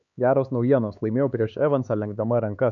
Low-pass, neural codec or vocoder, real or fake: 7.2 kHz; codec, 16 kHz, 4 kbps, X-Codec, HuBERT features, trained on LibriSpeech; fake